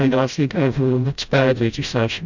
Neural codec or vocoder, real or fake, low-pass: codec, 16 kHz, 0.5 kbps, FreqCodec, smaller model; fake; 7.2 kHz